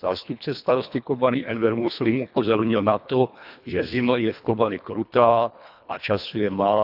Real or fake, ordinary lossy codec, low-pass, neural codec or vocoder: fake; none; 5.4 kHz; codec, 24 kHz, 1.5 kbps, HILCodec